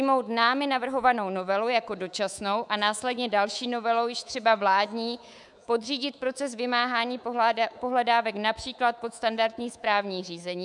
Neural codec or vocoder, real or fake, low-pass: codec, 24 kHz, 3.1 kbps, DualCodec; fake; 10.8 kHz